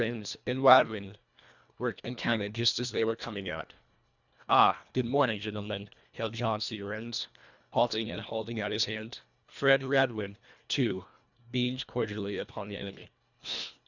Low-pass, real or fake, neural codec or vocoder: 7.2 kHz; fake; codec, 24 kHz, 1.5 kbps, HILCodec